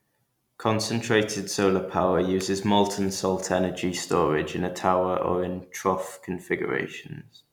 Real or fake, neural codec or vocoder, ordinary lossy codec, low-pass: real; none; none; 19.8 kHz